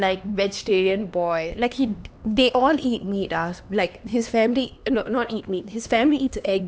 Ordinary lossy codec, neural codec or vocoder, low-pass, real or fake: none; codec, 16 kHz, 2 kbps, X-Codec, HuBERT features, trained on LibriSpeech; none; fake